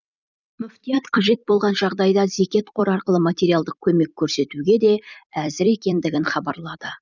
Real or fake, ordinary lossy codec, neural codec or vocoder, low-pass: fake; none; vocoder, 44.1 kHz, 128 mel bands every 256 samples, BigVGAN v2; 7.2 kHz